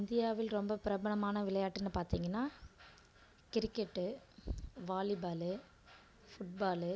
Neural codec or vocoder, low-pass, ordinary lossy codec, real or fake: none; none; none; real